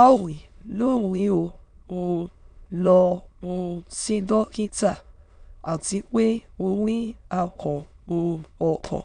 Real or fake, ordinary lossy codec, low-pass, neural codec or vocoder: fake; none; 9.9 kHz; autoencoder, 22.05 kHz, a latent of 192 numbers a frame, VITS, trained on many speakers